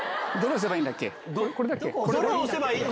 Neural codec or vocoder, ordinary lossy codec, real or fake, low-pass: none; none; real; none